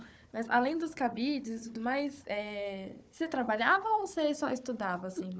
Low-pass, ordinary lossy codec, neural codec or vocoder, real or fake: none; none; codec, 16 kHz, 4 kbps, FunCodec, trained on Chinese and English, 50 frames a second; fake